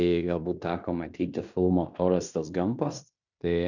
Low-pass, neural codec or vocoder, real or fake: 7.2 kHz; codec, 16 kHz in and 24 kHz out, 0.9 kbps, LongCat-Audio-Codec, fine tuned four codebook decoder; fake